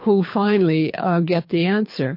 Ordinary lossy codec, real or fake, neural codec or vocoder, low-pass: MP3, 32 kbps; fake; codec, 24 kHz, 6 kbps, HILCodec; 5.4 kHz